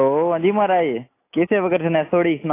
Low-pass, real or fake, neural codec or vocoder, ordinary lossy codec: 3.6 kHz; real; none; AAC, 24 kbps